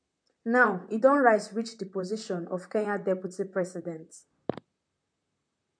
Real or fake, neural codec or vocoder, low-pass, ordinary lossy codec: fake; vocoder, 44.1 kHz, 128 mel bands, Pupu-Vocoder; 9.9 kHz; MP3, 64 kbps